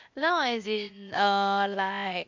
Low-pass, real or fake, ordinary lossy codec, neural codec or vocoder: 7.2 kHz; fake; MP3, 48 kbps; codec, 16 kHz, 0.8 kbps, ZipCodec